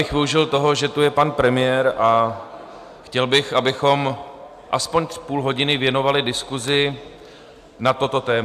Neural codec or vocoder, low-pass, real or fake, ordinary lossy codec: none; 14.4 kHz; real; AAC, 96 kbps